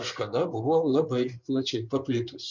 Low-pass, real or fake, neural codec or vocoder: 7.2 kHz; fake; codec, 16 kHz in and 24 kHz out, 2.2 kbps, FireRedTTS-2 codec